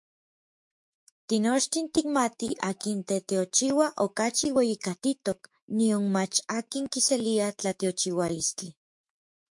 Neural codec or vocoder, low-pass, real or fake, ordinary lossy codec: autoencoder, 48 kHz, 128 numbers a frame, DAC-VAE, trained on Japanese speech; 10.8 kHz; fake; MP3, 64 kbps